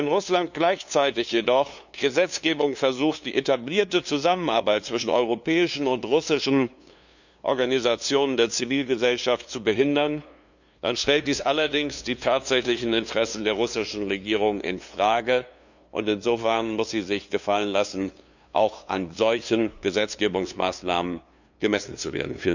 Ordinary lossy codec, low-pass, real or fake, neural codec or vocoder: none; 7.2 kHz; fake; codec, 16 kHz, 2 kbps, FunCodec, trained on LibriTTS, 25 frames a second